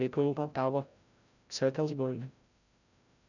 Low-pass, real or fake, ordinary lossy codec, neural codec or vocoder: 7.2 kHz; fake; none; codec, 16 kHz, 0.5 kbps, FreqCodec, larger model